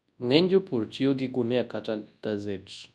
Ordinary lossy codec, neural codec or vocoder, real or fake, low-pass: none; codec, 24 kHz, 0.9 kbps, WavTokenizer, large speech release; fake; none